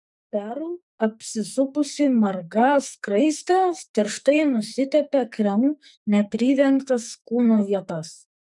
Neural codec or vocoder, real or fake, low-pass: codec, 44.1 kHz, 2.6 kbps, SNAC; fake; 10.8 kHz